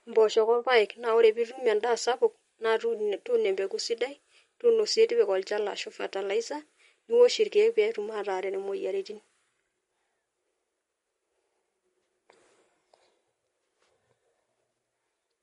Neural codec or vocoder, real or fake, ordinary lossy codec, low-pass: none; real; MP3, 48 kbps; 19.8 kHz